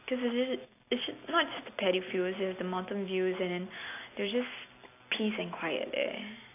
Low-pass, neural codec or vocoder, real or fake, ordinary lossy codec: 3.6 kHz; none; real; AAC, 24 kbps